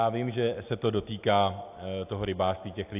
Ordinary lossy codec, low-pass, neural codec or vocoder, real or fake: AAC, 32 kbps; 3.6 kHz; none; real